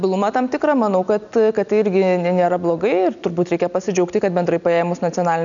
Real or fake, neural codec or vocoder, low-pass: real; none; 7.2 kHz